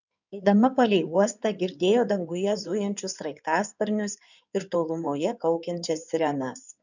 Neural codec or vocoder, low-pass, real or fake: codec, 16 kHz in and 24 kHz out, 2.2 kbps, FireRedTTS-2 codec; 7.2 kHz; fake